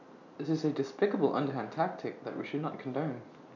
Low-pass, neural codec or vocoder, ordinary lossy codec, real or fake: 7.2 kHz; none; none; real